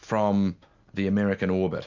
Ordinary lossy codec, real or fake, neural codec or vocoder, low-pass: Opus, 64 kbps; real; none; 7.2 kHz